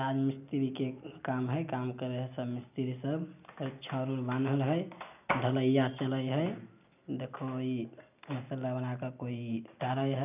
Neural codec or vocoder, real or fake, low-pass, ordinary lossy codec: none; real; 3.6 kHz; none